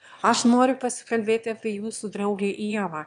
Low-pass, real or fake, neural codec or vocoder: 9.9 kHz; fake; autoencoder, 22.05 kHz, a latent of 192 numbers a frame, VITS, trained on one speaker